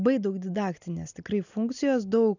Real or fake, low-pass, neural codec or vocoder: real; 7.2 kHz; none